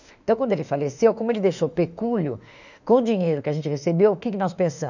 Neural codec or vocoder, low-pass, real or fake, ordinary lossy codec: autoencoder, 48 kHz, 32 numbers a frame, DAC-VAE, trained on Japanese speech; 7.2 kHz; fake; none